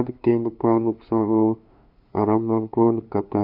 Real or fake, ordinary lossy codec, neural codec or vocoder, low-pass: fake; AAC, 48 kbps; codec, 16 kHz, 2 kbps, FunCodec, trained on LibriTTS, 25 frames a second; 5.4 kHz